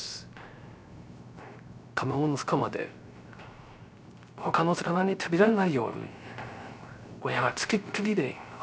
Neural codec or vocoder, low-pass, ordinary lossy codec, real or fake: codec, 16 kHz, 0.3 kbps, FocalCodec; none; none; fake